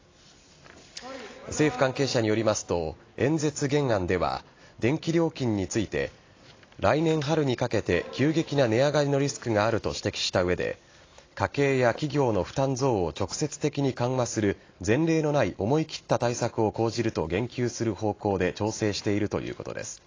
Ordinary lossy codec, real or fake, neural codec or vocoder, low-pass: AAC, 32 kbps; real; none; 7.2 kHz